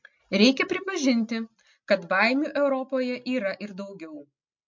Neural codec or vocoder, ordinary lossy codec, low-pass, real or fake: none; MP3, 48 kbps; 7.2 kHz; real